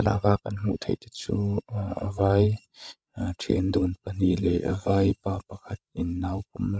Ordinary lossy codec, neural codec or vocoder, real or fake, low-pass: none; codec, 16 kHz, 16 kbps, FreqCodec, larger model; fake; none